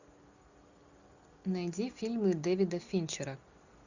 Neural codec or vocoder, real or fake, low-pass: none; real; 7.2 kHz